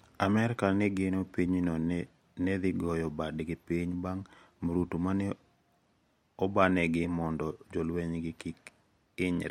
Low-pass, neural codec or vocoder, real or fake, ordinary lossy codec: 19.8 kHz; none; real; MP3, 64 kbps